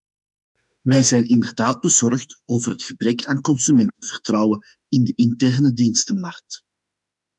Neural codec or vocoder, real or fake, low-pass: autoencoder, 48 kHz, 32 numbers a frame, DAC-VAE, trained on Japanese speech; fake; 10.8 kHz